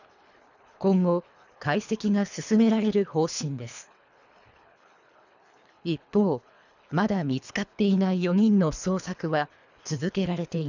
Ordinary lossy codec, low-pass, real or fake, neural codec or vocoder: none; 7.2 kHz; fake; codec, 24 kHz, 3 kbps, HILCodec